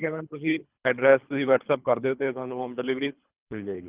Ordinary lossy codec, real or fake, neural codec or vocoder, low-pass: Opus, 24 kbps; fake; codec, 24 kHz, 3 kbps, HILCodec; 3.6 kHz